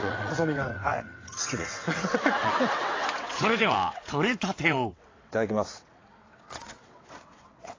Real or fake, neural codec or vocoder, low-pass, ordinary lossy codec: fake; vocoder, 22.05 kHz, 80 mel bands, WaveNeXt; 7.2 kHz; AAC, 32 kbps